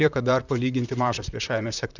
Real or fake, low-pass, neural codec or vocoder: fake; 7.2 kHz; vocoder, 44.1 kHz, 128 mel bands, Pupu-Vocoder